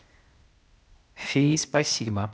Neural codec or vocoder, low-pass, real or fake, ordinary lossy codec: codec, 16 kHz, 0.8 kbps, ZipCodec; none; fake; none